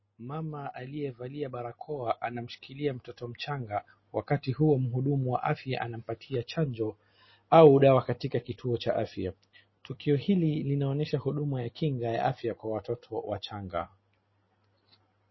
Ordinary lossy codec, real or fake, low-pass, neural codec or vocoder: MP3, 24 kbps; real; 7.2 kHz; none